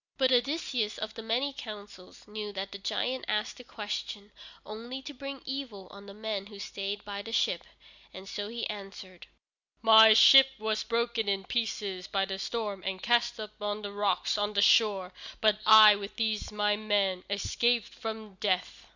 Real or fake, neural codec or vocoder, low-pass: real; none; 7.2 kHz